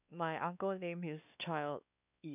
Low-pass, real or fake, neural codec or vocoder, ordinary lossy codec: 3.6 kHz; fake; codec, 16 kHz, 2 kbps, X-Codec, WavLM features, trained on Multilingual LibriSpeech; none